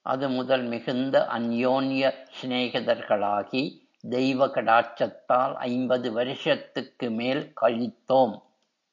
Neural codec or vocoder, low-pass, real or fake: none; 7.2 kHz; real